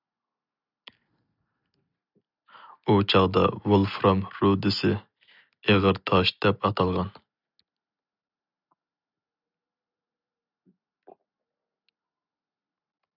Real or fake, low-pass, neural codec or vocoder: real; 5.4 kHz; none